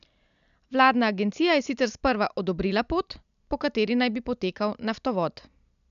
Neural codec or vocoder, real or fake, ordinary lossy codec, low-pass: none; real; none; 7.2 kHz